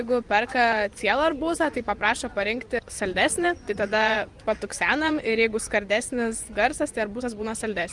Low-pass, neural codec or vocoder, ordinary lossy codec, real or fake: 10.8 kHz; vocoder, 24 kHz, 100 mel bands, Vocos; Opus, 32 kbps; fake